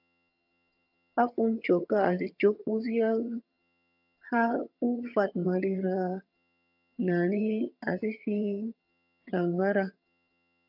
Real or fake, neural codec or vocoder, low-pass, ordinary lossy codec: fake; vocoder, 22.05 kHz, 80 mel bands, HiFi-GAN; 5.4 kHz; AAC, 48 kbps